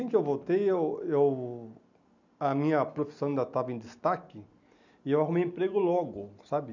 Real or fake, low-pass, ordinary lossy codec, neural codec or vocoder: real; 7.2 kHz; none; none